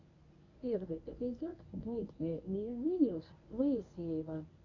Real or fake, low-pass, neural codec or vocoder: fake; 7.2 kHz; codec, 24 kHz, 0.9 kbps, WavTokenizer, medium speech release version 1